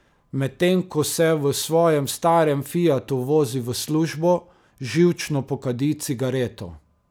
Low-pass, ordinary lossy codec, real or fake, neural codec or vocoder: none; none; real; none